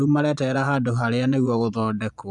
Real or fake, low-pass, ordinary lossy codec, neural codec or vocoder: fake; 10.8 kHz; none; vocoder, 24 kHz, 100 mel bands, Vocos